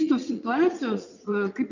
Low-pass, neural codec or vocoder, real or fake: 7.2 kHz; vocoder, 44.1 kHz, 80 mel bands, Vocos; fake